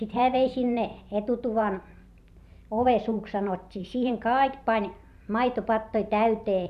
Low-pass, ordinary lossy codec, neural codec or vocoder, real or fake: 14.4 kHz; none; vocoder, 44.1 kHz, 128 mel bands every 512 samples, BigVGAN v2; fake